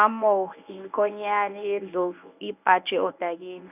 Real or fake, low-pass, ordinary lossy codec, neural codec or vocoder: fake; 3.6 kHz; none; codec, 24 kHz, 0.9 kbps, WavTokenizer, medium speech release version 1